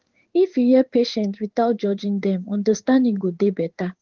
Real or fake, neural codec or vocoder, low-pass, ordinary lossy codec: fake; codec, 16 kHz in and 24 kHz out, 1 kbps, XY-Tokenizer; 7.2 kHz; Opus, 16 kbps